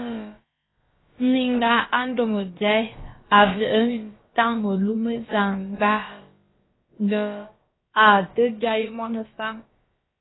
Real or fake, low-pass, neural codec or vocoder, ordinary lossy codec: fake; 7.2 kHz; codec, 16 kHz, about 1 kbps, DyCAST, with the encoder's durations; AAC, 16 kbps